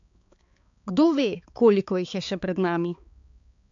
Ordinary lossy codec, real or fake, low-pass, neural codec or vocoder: AAC, 64 kbps; fake; 7.2 kHz; codec, 16 kHz, 4 kbps, X-Codec, HuBERT features, trained on balanced general audio